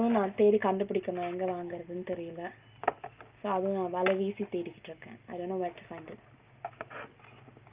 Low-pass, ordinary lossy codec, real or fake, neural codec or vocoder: 3.6 kHz; Opus, 24 kbps; real; none